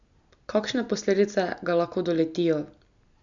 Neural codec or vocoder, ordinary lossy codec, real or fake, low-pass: none; none; real; 7.2 kHz